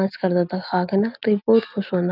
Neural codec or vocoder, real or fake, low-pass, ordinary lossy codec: none; real; 5.4 kHz; none